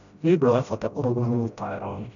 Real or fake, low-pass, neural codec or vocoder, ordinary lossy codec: fake; 7.2 kHz; codec, 16 kHz, 0.5 kbps, FreqCodec, smaller model; none